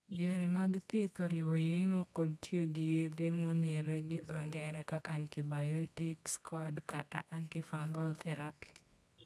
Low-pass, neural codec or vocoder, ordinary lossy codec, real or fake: none; codec, 24 kHz, 0.9 kbps, WavTokenizer, medium music audio release; none; fake